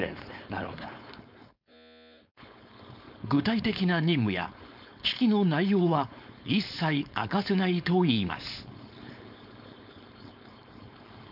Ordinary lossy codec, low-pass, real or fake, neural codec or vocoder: none; 5.4 kHz; fake; codec, 16 kHz, 4.8 kbps, FACodec